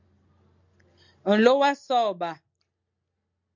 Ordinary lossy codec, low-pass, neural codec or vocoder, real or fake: MP3, 64 kbps; 7.2 kHz; none; real